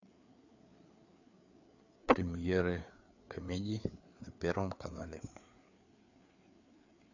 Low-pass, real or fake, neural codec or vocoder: 7.2 kHz; fake; codec, 16 kHz, 4 kbps, FreqCodec, larger model